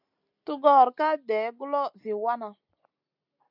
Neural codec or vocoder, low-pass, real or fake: none; 5.4 kHz; real